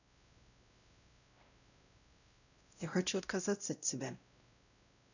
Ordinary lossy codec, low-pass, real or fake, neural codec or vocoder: none; 7.2 kHz; fake; codec, 16 kHz, 0.5 kbps, X-Codec, WavLM features, trained on Multilingual LibriSpeech